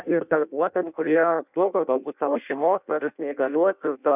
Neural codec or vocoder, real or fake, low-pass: codec, 16 kHz in and 24 kHz out, 0.6 kbps, FireRedTTS-2 codec; fake; 3.6 kHz